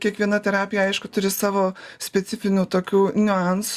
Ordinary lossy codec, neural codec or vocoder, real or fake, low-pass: Opus, 64 kbps; none; real; 14.4 kHz